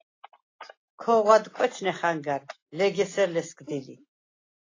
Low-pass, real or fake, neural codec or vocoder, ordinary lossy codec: 7.2 kHz; real; none; AAC, 32 kbps